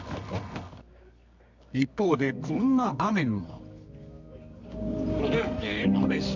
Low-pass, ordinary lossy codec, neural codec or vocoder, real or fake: 7.2 kHz; MP3, 64 kbps; codec, 24 kHz, 0.9 kbps, WavTokenizer, medium music audio release; fake